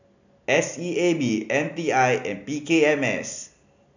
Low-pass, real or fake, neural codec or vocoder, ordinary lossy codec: 7.2 kHz; real; none; none